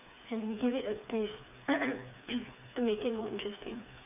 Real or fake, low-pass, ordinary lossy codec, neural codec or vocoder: fake; 3.6 kHz; none; codec, 16 kHz, 4 kbps, FreqCodec, smaller model